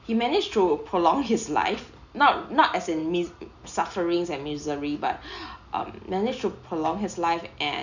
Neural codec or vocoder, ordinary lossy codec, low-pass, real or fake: none; none; 7.2 kHz; real